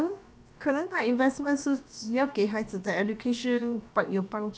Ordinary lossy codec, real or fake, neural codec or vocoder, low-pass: none; fake; codec, 16 kHz, about 1 kbps, DyCAST, with the encoder's durations; none